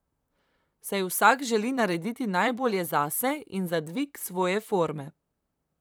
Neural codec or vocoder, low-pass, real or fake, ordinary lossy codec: vocoder, 44.1 kHz, 128 mel bands, Pupu-Vocoder; none; fake; none